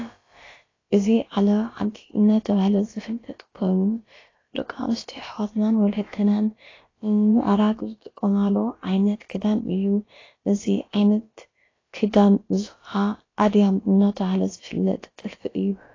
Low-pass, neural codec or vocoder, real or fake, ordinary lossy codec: 7.2 kHz; codec, 16 kHz, about 1 kbps, DyCAST, with the encoder's durations; fake; AAC, 32 kbps